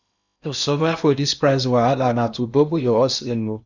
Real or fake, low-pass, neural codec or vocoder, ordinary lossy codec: fake; 7.2 kHz; codec, 16 kHz in and 24 kHz out, 0.8 kbps, FocalCodec, streaming, 65536 codes; none